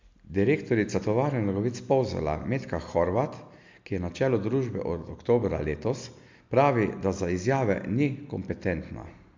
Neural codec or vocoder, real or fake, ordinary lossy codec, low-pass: none; real; MP3, 64 kbps; 7.2 kHz